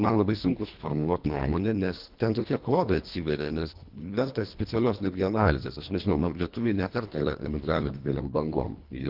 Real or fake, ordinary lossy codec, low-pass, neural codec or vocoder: fake; Opus, 32 kbps; 5.4 kHz; codec, 24 kHz, 1.5 kbps, HILCodec